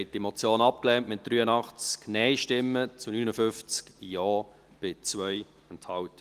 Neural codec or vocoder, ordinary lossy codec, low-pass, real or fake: none; Opus, 24 kbps; 14.4 kHz; real